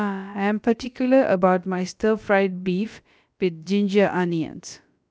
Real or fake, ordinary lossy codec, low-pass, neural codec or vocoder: fake; none; none; codec, 16 kHz, about 1 kbps, DyCAST, with the encoder's durations